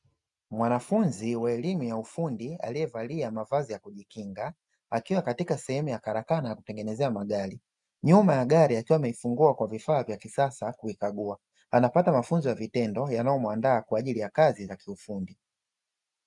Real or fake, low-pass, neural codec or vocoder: real; 10.8 kHz; none